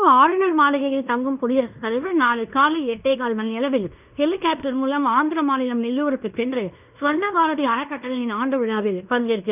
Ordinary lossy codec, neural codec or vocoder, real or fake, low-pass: none; codec, 16 kHz in and 24 kHz out, 0.9 kbps, LongCat-Audio-Codec, fine tuned four codebook decoder; fake; 3.6 kHz